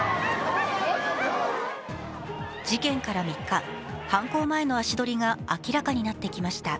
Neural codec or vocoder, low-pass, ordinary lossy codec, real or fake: none; none; none; real